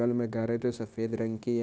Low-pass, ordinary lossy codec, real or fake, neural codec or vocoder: none; none; fake; codec, 16 kHz, 0.9 kbps, LongCat-Audio-Codec